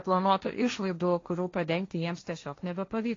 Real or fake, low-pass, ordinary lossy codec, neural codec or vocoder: fake; 7.2 kHz; AAC, 32 kbps; codec, 16 kHz, 1.1 kbps, Voila-Tokenizer